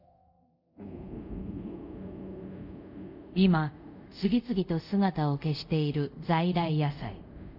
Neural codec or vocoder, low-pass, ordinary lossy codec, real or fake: codec, 24 kHz, 0.5 kbps, DualCodec; 5.4 kHz; none; fake